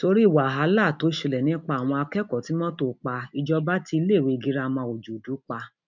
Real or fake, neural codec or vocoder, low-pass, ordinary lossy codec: real; none; 7.2 kHz; none